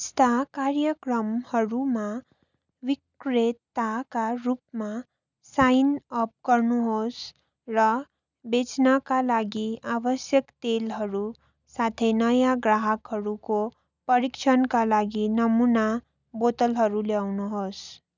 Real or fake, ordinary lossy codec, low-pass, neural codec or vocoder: real; none; 7.2 kHz; none